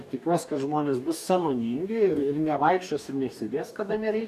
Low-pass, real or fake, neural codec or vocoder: 14.4 kHz; fake; codec, 44.1 kHz, 2.6 kbps, DAC